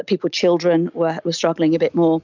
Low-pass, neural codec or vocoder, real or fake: 7.2 kHz; none; real